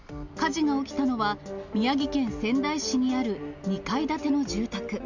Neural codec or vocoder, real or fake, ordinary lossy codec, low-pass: none; real; none; 7.2 kHz